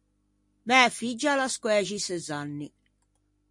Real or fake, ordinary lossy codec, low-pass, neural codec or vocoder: real; MP3, 48 kbps; 10.8 kHz; none